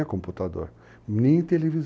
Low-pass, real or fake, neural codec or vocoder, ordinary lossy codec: none; real; none; none